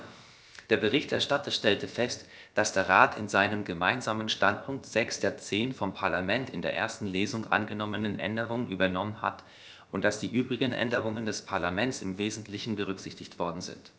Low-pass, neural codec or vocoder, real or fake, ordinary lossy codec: none; codec, 16 kHz, about 1 kbps, DyCAST, with the encoder's durations; fake; none